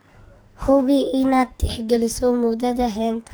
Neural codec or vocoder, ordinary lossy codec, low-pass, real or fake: codec, 44.1 kHz, 2.6 kbps, SNAC; none; none; fake